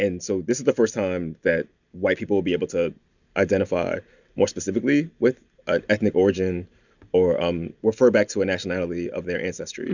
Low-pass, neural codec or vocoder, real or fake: 7.2 kHz; none; real